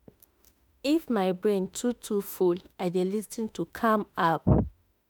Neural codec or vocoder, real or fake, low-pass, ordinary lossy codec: autoencoder, 48 kHz, 32 numbers a frame, DAC-VAE, trained on Japanese speech; fake; none; none